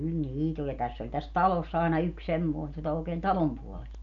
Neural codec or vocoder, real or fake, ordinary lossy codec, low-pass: none; real; none; 7.2 kHz